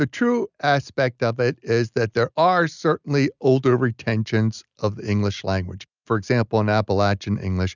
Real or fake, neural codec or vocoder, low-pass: real; none; 7.2 kHz